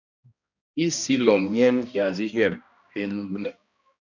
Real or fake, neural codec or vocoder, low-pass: fake; codec, 16 kHz, 1 kbps, X-Codec, HuBERT features, trained on balanced general audio; 7.2 kHz